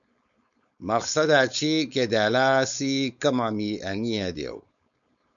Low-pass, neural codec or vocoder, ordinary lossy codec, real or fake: 7.2 kHz; codec, 16 kHz, 4.8 kbps, FACodec; MP3, 96 kbps; fake